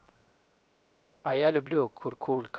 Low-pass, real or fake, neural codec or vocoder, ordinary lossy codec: none; fake; codec, 16 kHz, 0.7 kbps, FocalCodec; none